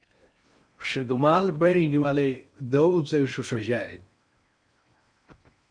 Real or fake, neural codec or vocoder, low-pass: fake; codec, 16 kHz in and 24 kHz out, 0.6 kbps, FocalCodec, streaming, 4096 codes; 9.9 kHz